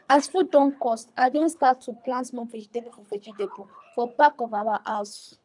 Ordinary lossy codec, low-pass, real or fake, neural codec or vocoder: none; 10.8 kHz; fake; codec, 24 kHz, 3 kbps, HILCodec